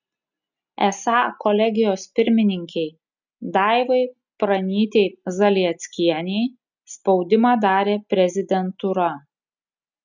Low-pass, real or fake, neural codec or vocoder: 7.2 kHz; real; none